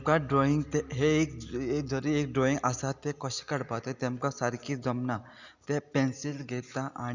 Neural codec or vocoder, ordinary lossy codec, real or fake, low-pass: none; Opus, 64 kbps; real; 7.2 kHz